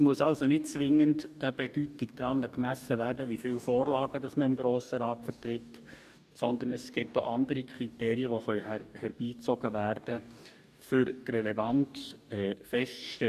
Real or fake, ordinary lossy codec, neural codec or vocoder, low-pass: fake; none; codec, 44.1 kHz, 2.6 kbps, DAC; 14.4 kHz